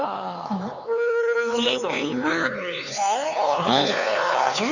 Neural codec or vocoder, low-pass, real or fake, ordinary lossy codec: codec, 16 kHz, 4 kbps, X-Codec, HuBERT features, trained on LibriSpeech; 7.2 kHz; fake; none